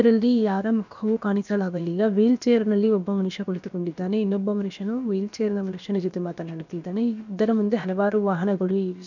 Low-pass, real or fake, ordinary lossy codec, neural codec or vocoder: 7.2 kHz; fake; none; codec, 16 kHz, about 1 kbps, DyCAST, with the encoder's durations